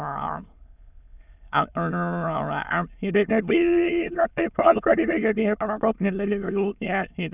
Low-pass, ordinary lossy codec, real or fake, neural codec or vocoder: 3.6 kHz; none; fake; autoencoder, 22.05 kHz, a latent of 192 numbers a frame, VITS, trained on many speakers